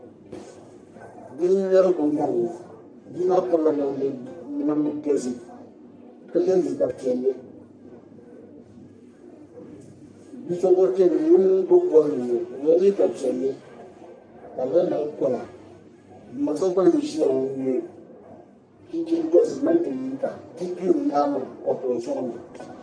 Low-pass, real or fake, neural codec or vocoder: 9.9 kHz; fake; codec, 44.1 kHz, 1.7 kbps, Pupu-Codec